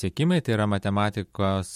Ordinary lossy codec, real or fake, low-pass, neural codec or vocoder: MP3, 64 kbps; real; 19.8 kHz; none